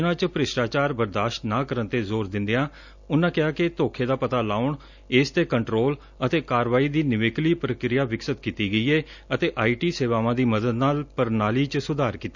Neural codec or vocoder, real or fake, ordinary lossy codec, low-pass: none; real; none; 7.2 kHz